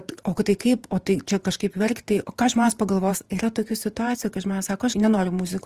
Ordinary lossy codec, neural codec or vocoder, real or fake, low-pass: Opus, 24 kbps; vocoder, 48 kHz, 128 mel bands, Vocos; fake; 14.4 kHz